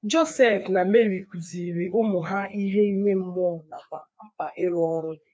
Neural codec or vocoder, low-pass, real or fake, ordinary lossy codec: codec, 16 kHz, 2 kbps, FreqCodec, larger model; none; fake; none